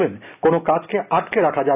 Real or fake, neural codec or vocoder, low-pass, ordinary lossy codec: real; none; 3.6 kHz; none